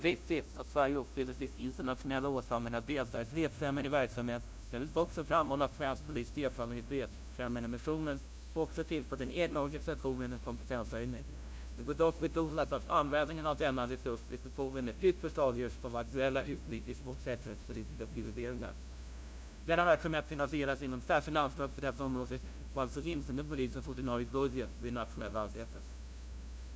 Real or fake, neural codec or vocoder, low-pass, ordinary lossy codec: fake; codec, 16 kHz, 0.5 kbps, FunCodec, trained on LibriTTS, 25 frames a second; none; none